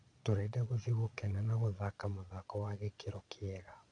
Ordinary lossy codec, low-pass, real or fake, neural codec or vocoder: none; 9.9 kHz; fake; vocoder, 22.05 kHz, 80 mel bands, Vocos